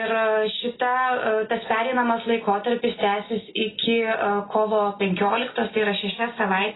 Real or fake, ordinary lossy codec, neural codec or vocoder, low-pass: real; AAC, 16 kbps; none; 7.2 kHz